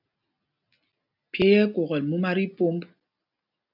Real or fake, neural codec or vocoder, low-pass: real; none; 5.4 kHz